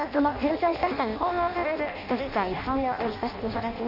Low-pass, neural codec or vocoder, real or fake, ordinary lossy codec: 5.4 kHz; codec, 16 kHz in and 24 kHz out, 0.6 kbps, FireRedTTS-2 codec; fake; none